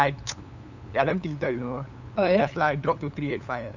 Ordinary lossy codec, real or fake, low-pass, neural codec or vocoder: none; fake; 7.2 kHz; codec, 16 kHz, 8 kbps, FunCodec, trained on LibriTTS, 25 frames a second